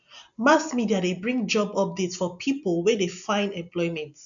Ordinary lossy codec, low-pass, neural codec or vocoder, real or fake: none; 7.2 kHz; none; real